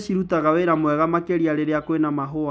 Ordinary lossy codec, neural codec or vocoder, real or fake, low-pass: none; none; real; none